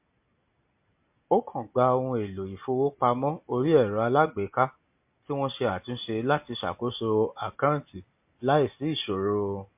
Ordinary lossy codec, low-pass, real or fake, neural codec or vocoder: MP3, 32 kbps; 3.6 kHz; real; none